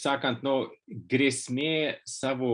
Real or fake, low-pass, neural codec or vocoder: real; 9.9 kHz; none